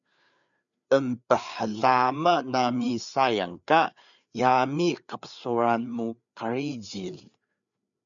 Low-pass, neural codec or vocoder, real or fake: 7.2 kHz; codec, 16 kHz, 4 kbps, FreqCodec, larger model; fake